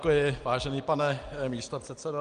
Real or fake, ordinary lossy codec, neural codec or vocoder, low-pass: real; Opus, 32 kbps; none; 9.9 kHz